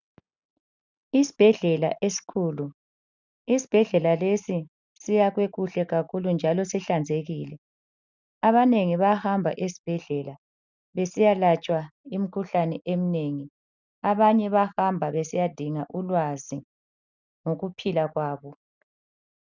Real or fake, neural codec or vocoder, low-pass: real; none; 7.2 kHz